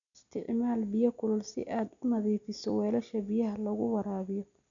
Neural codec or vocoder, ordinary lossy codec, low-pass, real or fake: none; none; 7.2 kHz; real